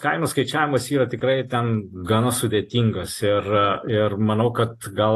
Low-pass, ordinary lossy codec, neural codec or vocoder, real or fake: 14.4 kHz; AAC, 48 kbps; none; real